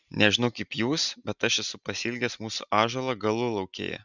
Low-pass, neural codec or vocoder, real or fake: 7.2 kHz; none; real